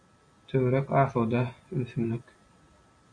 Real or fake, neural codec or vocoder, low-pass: real; none; 9.9 kHz